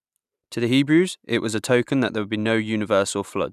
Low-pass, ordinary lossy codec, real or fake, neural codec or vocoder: 14.4 kHz; none; real; none